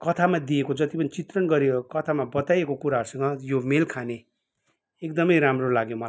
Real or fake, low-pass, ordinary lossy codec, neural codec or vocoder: real; none; none; none